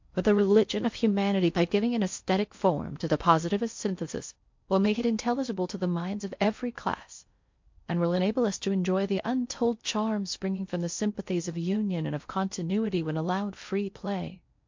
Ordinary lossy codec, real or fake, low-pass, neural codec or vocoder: MP3, 48 kbps; fake; 7.2 kHz; codec, 16 kHz in and 24 kHz out, 0.6 kbps, FocalCodec, streaming, 4096 codes